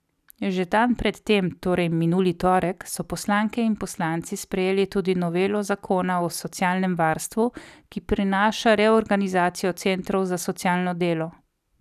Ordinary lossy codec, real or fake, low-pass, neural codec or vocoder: none; real; 14.4 kHz; none